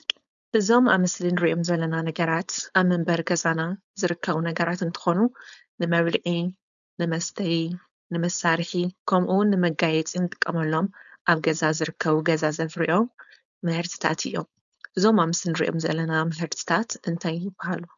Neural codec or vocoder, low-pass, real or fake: codec, 16 kHz, 4.8 kbps, FACodec; 7.2 kHz; fake